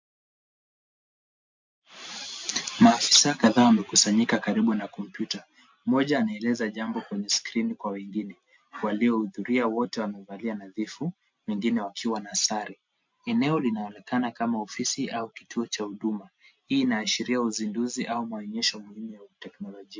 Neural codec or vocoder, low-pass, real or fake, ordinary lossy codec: none; 7.2 kHz; real; MP3, 48 kbps